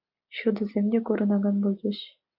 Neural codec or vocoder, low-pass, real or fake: none; 5.4 kHz; real